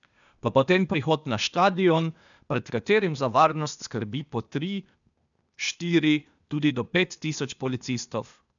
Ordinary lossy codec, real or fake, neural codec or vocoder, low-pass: none; fake; codec, 16 kHz, 0.8 kbps, ZipCodec; 7.2 kHz